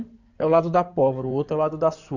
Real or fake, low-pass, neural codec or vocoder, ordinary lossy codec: fake; 7.2 kHz; codec, 16 kHz in and 24 kHz out, 2.2 kbps, FireRedTTS-2 codec; none